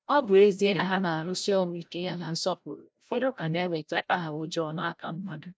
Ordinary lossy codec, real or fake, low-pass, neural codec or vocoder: none; fake; none; codec, 16 kHz, 0.5 kbps, FreqCodec, larger model